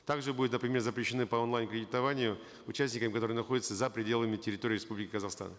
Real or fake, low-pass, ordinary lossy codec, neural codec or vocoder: real; none; none; none